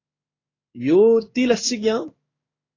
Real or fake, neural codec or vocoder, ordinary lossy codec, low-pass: fake; codec, 16 kHz in and 24 kHz out, 1 kbps, XY-Tokenizer; AAC, 32 kbps; 7.2 kHz